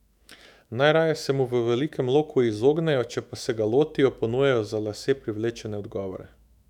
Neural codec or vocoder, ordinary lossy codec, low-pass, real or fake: autoencoder, 48 kHz, 128 numbers a frame, DAC-VAE, trained on Japanese speech; none; 19.8 kHz; fake